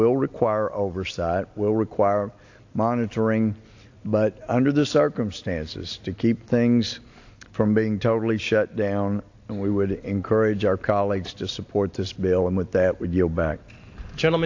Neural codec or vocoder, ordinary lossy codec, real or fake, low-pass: none; AAC, 48 kbps; real; 7.2 kHz